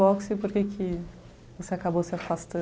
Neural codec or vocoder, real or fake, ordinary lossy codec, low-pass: none; real; none; none